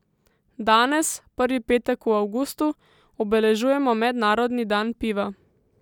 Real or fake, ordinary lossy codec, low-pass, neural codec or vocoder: real; none; 19.8 kHz; none